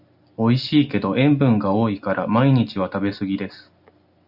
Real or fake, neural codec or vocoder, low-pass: real; none; 5.4 kHz